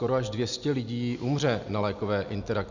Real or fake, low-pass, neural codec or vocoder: real; 7.2 kHz; none